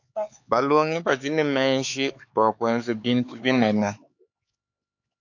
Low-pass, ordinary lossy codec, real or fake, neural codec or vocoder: 7.2 kHz; AAC, 48 kbps; fake; codec, 16 kHz, 4 kbps, X-Codec, HuBERT features, trained on LibriSpeech